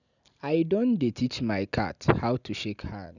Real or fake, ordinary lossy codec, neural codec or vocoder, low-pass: real; none; none; 7.2 kHz